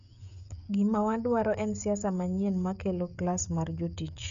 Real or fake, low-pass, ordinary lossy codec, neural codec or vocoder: fake; 7.2 kHz; none; codec, 16 kHz, 16 kbps, FreqCodec, smaller model